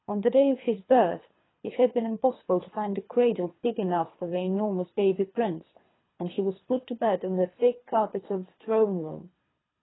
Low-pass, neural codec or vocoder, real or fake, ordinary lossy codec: 7.2 kHz; codec, 24 kHz, 3 kbps, HILCodec; fake; AAC, 16 kbps